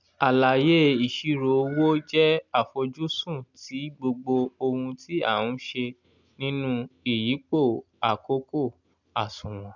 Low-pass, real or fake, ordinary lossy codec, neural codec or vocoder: 7.2 kHz; real; none; none